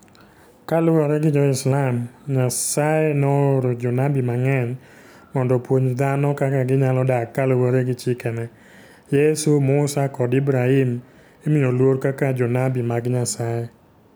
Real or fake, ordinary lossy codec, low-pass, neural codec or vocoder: real; none; none; none